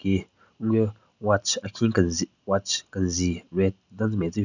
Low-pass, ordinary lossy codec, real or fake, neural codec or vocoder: 7.2 kHz; none; real; none